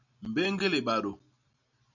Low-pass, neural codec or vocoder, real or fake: 7.2 kHz; none; real